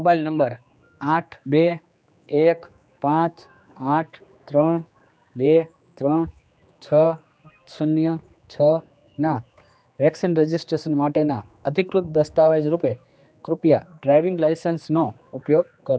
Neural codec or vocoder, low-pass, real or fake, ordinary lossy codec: codec, 16 kHz, 2 kbps, X-Codec, HuBERT features, trained on general audio; none; fake; none